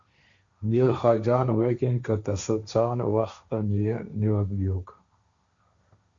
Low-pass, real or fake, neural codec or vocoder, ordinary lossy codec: 7.2 kHz; fake; codec, 16 kHz, 1.1 kbps, Voila-Tokenizer; Opus, 64 kbps